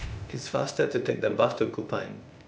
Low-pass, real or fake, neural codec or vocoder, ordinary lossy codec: none; fake; codec, 16 kHz, 0.8 kbps, ZipCodec; none